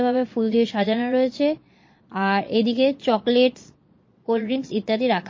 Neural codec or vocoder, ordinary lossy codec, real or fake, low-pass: vocoder, 22.05 kHz, 80 mel bands, Vocos; MP3, 32 kbps; fake; 7.2 kHz